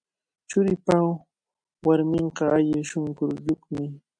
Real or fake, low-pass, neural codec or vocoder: real; 9.9 kHz; none